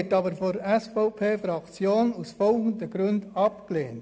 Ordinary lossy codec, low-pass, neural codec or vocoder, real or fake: none; none; none; real